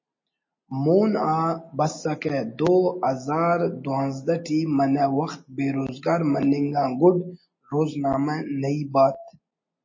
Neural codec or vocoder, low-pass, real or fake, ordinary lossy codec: none; 7.2 kHz; real; MP3, 32 kbps